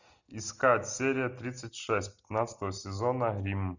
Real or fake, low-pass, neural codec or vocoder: real; 7.2 kHz; none